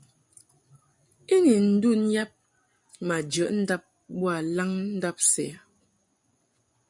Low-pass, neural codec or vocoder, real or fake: 10.8 kHz; vocoder, 24 kHz, 100 mel bands, Vocos; fake